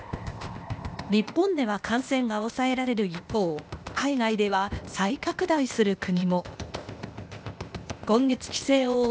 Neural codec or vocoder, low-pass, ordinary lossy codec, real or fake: codec, 16 kHz, 0.8 kbps, ZipCodec; none; none; fake